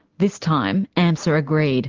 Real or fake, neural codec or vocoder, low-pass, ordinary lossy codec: real; none; 7.2 kHz; Opus, 16 kbps